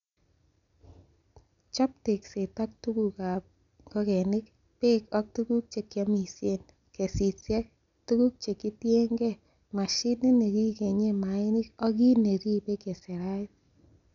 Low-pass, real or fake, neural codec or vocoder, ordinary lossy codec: 7.2 kHz; real; none; none